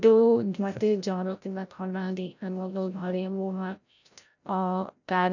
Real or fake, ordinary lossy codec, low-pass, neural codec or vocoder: fake; none; 7.2 kHz; codec, 16 kHz, 0.5 kbps, FreqCodec, larger model